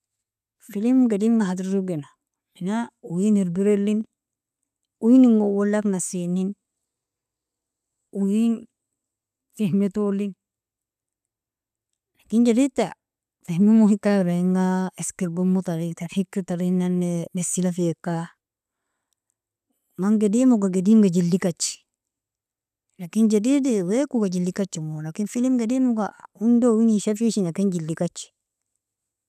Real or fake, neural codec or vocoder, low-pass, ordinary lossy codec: real; none; 14.4 kHz; none